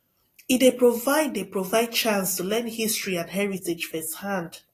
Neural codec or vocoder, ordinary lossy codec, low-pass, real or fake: none; AAC, 48 kbps; 14.4 kHz; real